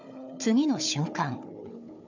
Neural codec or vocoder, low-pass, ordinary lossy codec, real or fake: codec, 16 kHz, 4 kbps, FunCodec, trained on Chinese and English, 50 frames a second; 7.2 kHz; MP3, 64 kbps; fake